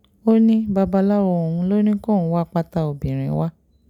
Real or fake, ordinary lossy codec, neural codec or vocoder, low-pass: real; none; none; 19.8 kHz